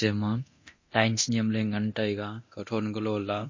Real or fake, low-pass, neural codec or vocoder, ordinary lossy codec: fake; 7.2 kHz; codec, 24 kHz, 0.9 kbps, DualCodec; MP3, 32 kbps